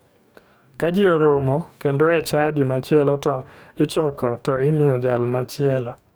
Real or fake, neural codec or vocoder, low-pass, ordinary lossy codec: fake; codec, 44.1 kHz, 2.6 kbps, DAC; none; none